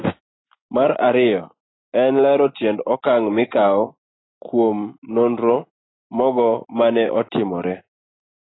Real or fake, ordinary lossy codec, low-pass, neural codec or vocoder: real; AAC, 16 kbps; 7.2 kHz; none